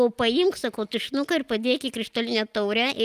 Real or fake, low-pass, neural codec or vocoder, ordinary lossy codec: real; 14.4 kHz; none; Opus, 32 kbps